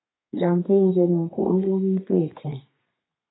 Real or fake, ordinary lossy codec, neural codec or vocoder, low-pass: fake; AAC, 16 kbps; codec, 32 kHz, 1.9 kbps, SNAC; 7.2 kHz